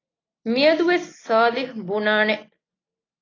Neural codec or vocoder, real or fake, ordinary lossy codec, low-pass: none; real; AAC, 32 kbps; 7.2 kHz